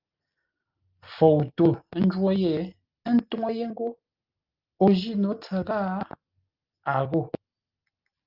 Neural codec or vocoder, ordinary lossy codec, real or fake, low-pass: none; Opus, 24 kbps; real; 5.4 kHz